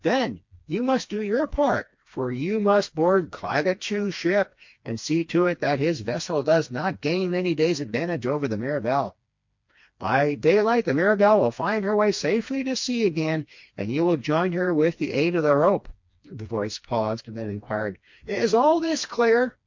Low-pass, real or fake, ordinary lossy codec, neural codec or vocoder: 7.2 kHz; fake; MP3, 48 kbps; codec, 16 kHz, 2 kbps, FreqCodec, smaller model